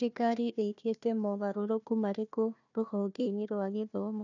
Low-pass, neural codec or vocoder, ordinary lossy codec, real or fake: 7.2 kHz; codec, 16 kHz, 1 kbps, FunCodec, trained on Chinese and English, 50 frames a second; none; fake